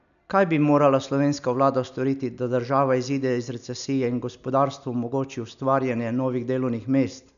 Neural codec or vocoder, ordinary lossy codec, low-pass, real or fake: none; none; 7.2 kHz; real